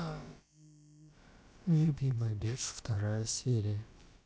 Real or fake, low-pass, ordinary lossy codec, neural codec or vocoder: fake; none; none; codec, 16 kHz, about 1 kbps, DyCAST, with the encoder's durations